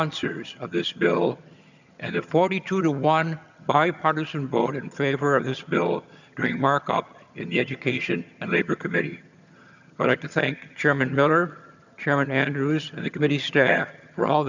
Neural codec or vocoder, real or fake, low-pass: vocoder, 22.05 kHz, 80 mel bands, HiFi-GAN; fake; 7.2 kHz